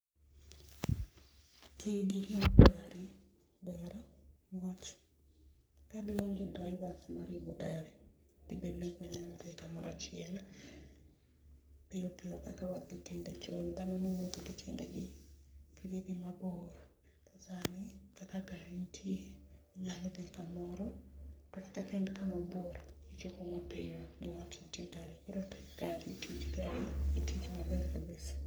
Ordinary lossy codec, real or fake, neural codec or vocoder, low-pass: none; fake; codec, 44.1 kHz, 3.4 kbps, Pupu-Codec; none